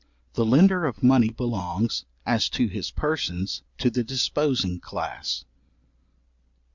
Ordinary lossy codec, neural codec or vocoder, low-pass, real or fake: Opus, 64 kbps; none; 7.2 kHz; real